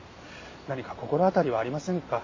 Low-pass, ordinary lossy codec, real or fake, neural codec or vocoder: 7.2 kHz; MP3, 32 kbps; real; none